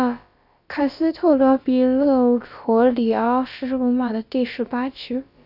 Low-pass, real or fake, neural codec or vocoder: 5.4 kHz; fake; codec, 16 kHz, about 1 kbps, DyCAST, with the encoder's durations